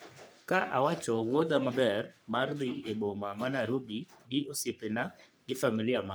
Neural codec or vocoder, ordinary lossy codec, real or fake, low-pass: codec, 44.1 kHz, 3.4 kbps, Pupu-Codec; none; fake; none